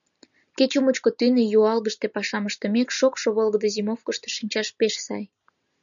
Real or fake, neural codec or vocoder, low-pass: real; none; 7.2 kHz